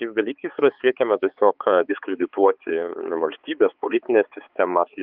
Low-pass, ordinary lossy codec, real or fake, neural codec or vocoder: 5.4 kHz; Opus, 24 kbps; fake; codec, 16 kHz, 4 kbps, X-Codec, HuBERT features, trained on balanced general audio